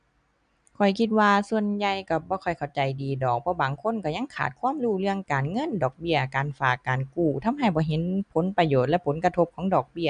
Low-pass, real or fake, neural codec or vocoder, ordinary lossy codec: 9.9 kHz; real; none; none